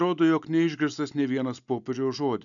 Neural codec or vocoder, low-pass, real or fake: none; 7.2 kHz; real